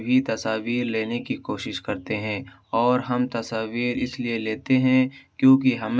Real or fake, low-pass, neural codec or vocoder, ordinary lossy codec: real; none; none; none